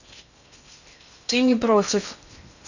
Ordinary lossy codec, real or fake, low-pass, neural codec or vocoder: none; fake; 7.2 kHz; codec, 16 kHz in and 24 kHz out, 0.6 kbps, FocalCodec, streaming, 2048 codes